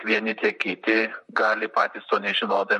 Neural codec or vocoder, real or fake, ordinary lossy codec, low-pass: vocoder, 44.1 kHz, 128 mel bands, Pupu-Vocoder; fake; MP3, 48 kbps; 14.4 kHz